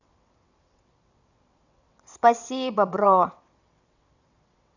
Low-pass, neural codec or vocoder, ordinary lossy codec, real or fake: 7.2 kHz; vocoder, 22.05 kHz, 80 mel bands, WaveNeXt; none; fake